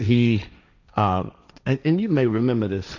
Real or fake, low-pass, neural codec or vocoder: fake; 7.2 kHz; codec, 16 kHz, 1.1 kbps, Voila-Tokenizer